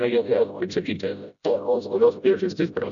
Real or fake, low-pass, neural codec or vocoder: fake; 7.2 kHz; codec, 16 kHz, 0.5 kbps, FreqCodec, smaller model